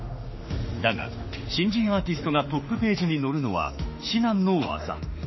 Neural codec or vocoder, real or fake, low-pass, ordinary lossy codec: autoencoder, 48 kHz, 32 numbers a frame, DAC-VAE, trained on Japanese speech; fake; 7.2 kHz; MP3, 24 kbps